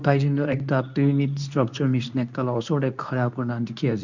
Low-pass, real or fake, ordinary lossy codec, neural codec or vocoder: 7.2 kHz; fake; none; codec, 24 kHz, 0.9 kbps, WavTokenizer, medium speech release version 2